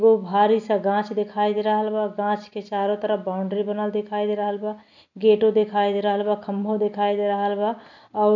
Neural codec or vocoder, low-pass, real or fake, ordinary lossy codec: none; 7.2 kHz; real; none